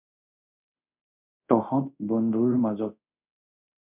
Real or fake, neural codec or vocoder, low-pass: fake; codec, 24 kHz, 0.5 kbps, DualCodec; 3.6 kHz